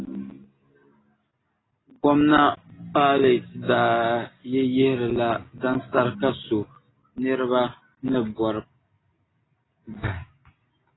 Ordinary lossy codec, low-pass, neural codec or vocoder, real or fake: AAC, 16 kbps; 7.2 kHz; none; real